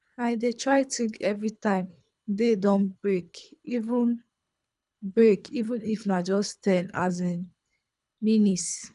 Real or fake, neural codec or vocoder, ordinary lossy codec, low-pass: fake; codec, 24 kHz, 3 kbps, HILCodec; none; 10.8 kHz